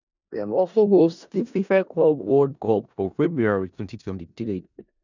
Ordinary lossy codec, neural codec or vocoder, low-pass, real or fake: none; codec, 16 kHz in and 24 kHz out, 0.4 kbps, LongCat-Audio-Codec, four codebook decoder; 7.2 kHz; fake